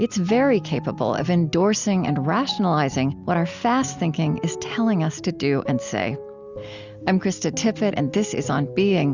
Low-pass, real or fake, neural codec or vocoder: 7.2 kHz; real; none